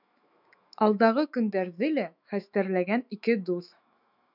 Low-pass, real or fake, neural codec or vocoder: 5.4 kHz; fake; autoencoder, 48 kHz, 128 numbers a frame, DAC-VAE, trained on Japanese speech